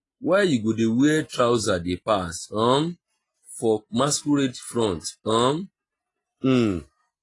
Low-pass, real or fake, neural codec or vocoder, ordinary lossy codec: 10.8 kHz; real; none; AAC, 32 kbps